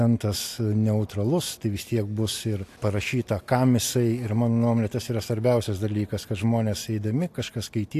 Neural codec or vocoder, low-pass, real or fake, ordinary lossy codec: none; 14.4 kHz; real; AAC, 64 kbps